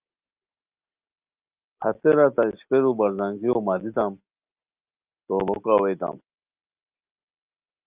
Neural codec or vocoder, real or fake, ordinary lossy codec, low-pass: none; real; Opus, 32 kbps; 3.6 kHz